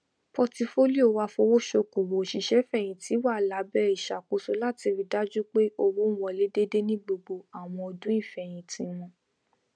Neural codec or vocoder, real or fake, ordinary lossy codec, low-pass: none; real; none; 9.9 kHz